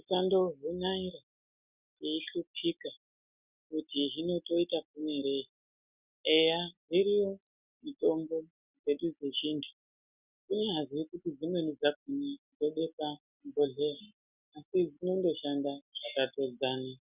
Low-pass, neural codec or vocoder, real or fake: 3.6 kHz; none; real